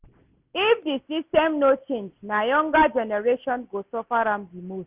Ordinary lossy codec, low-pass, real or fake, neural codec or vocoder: Opus, 64 kbps; 3.6 kHz; real; none